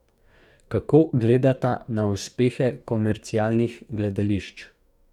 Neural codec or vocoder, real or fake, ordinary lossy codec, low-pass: codec, 44.1 kHz, 2.6 kbps, DAC; fake; none; 19.8 kHz